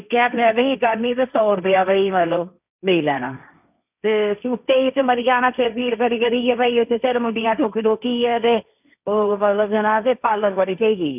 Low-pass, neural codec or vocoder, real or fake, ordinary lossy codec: 3.6 kHz; codec, 16 kHz, 1.1 kbps, Voila-Tokenizer; fake; none